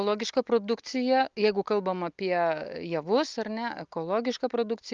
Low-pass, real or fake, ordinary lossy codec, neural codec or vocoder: 7.2 kHz; real; Opus, 24 kbps; none